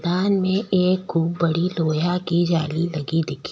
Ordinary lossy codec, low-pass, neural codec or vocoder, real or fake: none; none; none; real